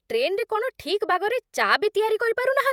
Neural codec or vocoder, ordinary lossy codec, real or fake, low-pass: vocoder, 48 kHz, 128 mel bands, Vocos; none; fake; 19.8 kHz